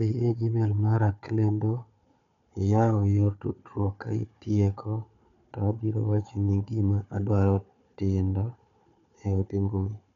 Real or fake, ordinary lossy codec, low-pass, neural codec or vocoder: fake; none; 7.2 kHz; codec, 16 kHz, 4 kbps, FunCodec, trained on Chinese and English, 50 frames a second